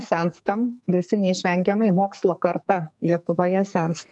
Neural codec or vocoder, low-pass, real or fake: codec, 44.1 kHz, 2.6 kbps, SNAC; 10.8 kHz; fake